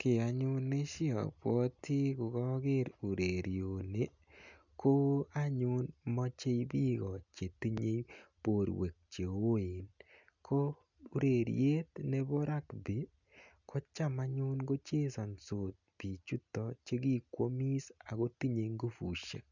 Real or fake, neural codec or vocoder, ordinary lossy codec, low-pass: real; none; none; 7.2 kHz